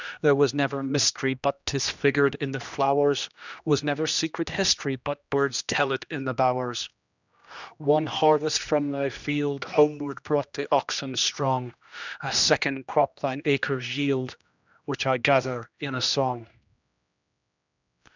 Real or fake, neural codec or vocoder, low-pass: fake; codec, 16 kHz, 1 kbps, X-Codec, HuBERT features, trained on general audio; 7.2 kHz